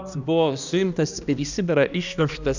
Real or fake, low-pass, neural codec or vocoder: fake; 7.2 kHz; codec, 16 kHz, 1 kbps, X-Codec, HuBERT features, trained on balanced general audio